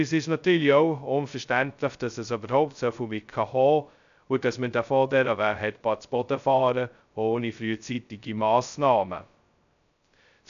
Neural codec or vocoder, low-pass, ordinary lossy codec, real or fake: codec, 16 kHz, 0.2 kbps, FocalCodec; 7.2 kHz; none; fake